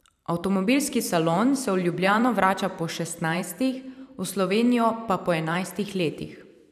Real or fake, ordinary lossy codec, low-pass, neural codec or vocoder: fake; none; 14.4 kHz; vocoder, 44.1 kHz, 128 mel bands every 512 samples, BigVGAN v2